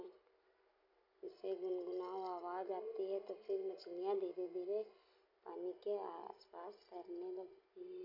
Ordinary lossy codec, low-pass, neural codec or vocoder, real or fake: none; 5.4 kHz; none; real